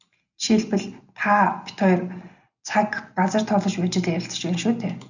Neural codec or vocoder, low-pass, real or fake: none; 7.2 kHz; real